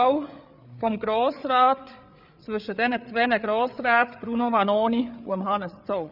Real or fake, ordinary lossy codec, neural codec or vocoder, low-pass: fake; none; codec, 16 kHz, 8 kbps, FreqCodec, larger model; 5.4 kHz